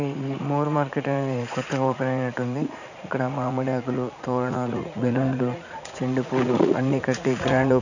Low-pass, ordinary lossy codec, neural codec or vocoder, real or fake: 7.2 kHz; none; vocoder, 44.1 kHz, 80 mel bands, Vocos; fake